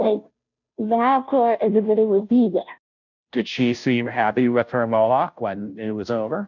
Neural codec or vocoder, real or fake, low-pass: codec, 16 kHz, 0.5 kbps, FunCodec, trained on Chinese and English, 25 frames a second; fake; 7.2 kHz